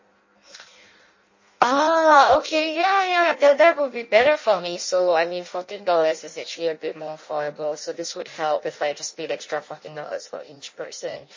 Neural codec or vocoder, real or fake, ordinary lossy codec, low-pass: codec, 16 kHz in and 24 kHz out, 0.6 kbps, FireRedTTS-2 codec; fake; MP3, 32 kbps; 7.2 kHz